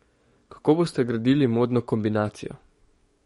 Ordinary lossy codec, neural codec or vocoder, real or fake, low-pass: MP3, 48 kbps; codec, 44.1 kHz, 7.8 kbps, DAC; fake; 19.8 kHz